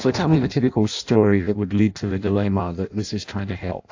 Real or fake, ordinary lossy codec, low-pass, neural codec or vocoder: fake; AAC, 48 kbps; 7.2 kHz; codec, 16 kHz in and 24 kHz out, 0.6 kbps, FireRedTTS-2 codec